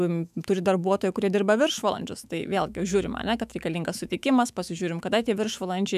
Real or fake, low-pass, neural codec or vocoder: fake; 14.4 kHz; autoencoder, 48 kHz, 128 numbers a frame, DAC-VAE, trained on Japanese speech